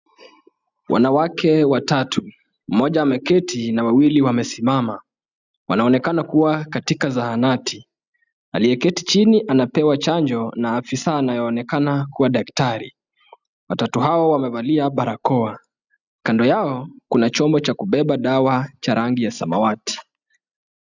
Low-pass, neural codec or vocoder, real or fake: 7.2 kHz; none; real